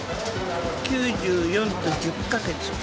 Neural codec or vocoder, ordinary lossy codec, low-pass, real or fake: none; none; none; real